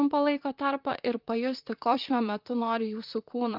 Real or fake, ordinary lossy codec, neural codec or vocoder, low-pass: fake; Opus, 32 kbps; vocoder, 44.1 kHz, 128 mel bands, Pupu-Vocoder; 5.4 kHz